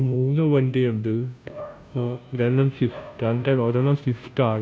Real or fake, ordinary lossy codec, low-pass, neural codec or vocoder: fake; none; none; codec, 16 kHz, 0.5 kbps, FunCodec, trained on Chinese and English, 25 frames a second